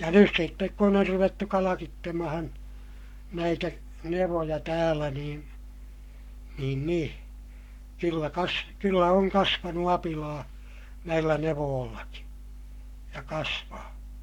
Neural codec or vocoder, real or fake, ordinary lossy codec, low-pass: codec, 44.1 kHz, 7.8 kbps, Pupu-Codec; fake; none; 19.8 kHz